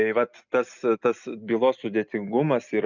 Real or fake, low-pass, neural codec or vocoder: real; 7.2 kHz; none